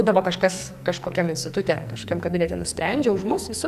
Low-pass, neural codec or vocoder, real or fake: 14.4 kHz; codec, 44.1 kHz, 2.6 kbps, SNAC; fake